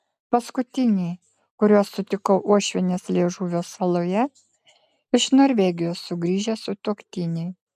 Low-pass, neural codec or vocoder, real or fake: 14.4 kHz; none; real